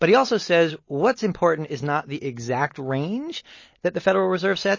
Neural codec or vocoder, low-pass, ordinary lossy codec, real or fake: none; 7.2 kHz; MP3, 32 kbps; real